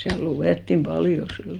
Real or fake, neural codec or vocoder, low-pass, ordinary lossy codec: real; none; 19.8 kHz; Opus, 32 kbps